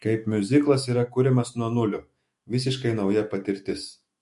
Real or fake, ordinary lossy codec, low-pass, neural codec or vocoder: fake; MP3, 48 kbps; 14.4 kHz; autoencoder, 48 kHz, 128 numbers a frame, DAC-VAE, trained on Japanese speech